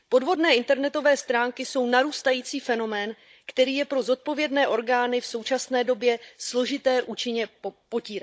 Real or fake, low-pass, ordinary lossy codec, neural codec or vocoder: fake; none; none; codec, 16 kHz, 16 kbps, FunCodec, trained on Chinese and English, 50 frames a second